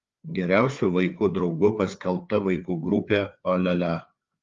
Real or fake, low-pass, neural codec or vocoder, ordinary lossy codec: fake; 7.2 kHz; codec, 16 kHz, 4 kbps, FreqCodec, larger model; Opus, 32 kbps